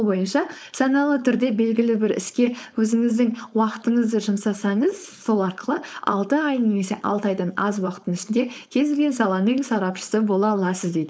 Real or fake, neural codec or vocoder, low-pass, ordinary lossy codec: fake; codec, 16 kHz, 4.8 kbps, FACodec; none; none